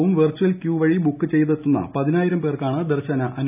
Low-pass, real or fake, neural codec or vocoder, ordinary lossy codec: 3.6 kHz; real; none; none